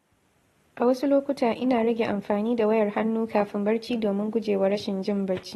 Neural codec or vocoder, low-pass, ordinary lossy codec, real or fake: none; 14.4 kHz; AAC, 32 kbps; real